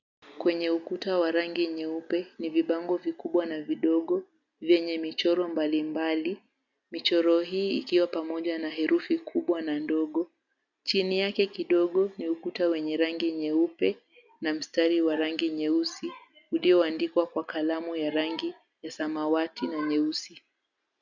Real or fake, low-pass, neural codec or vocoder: real; 7.2 kHz; none